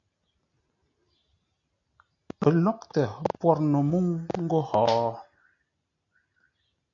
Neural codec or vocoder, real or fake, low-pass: none; real; 7.2 kHz